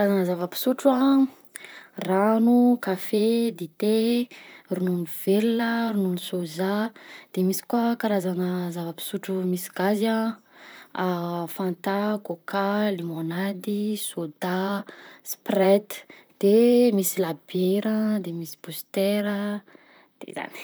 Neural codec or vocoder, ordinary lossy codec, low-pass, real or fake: vocoder, 44.1 kHz, 128 mel bands, Pupu-Vocoder; none; none; fake